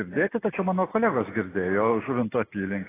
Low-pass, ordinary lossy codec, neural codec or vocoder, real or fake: 3.6 kHz; AAC, 16 kbps; none; real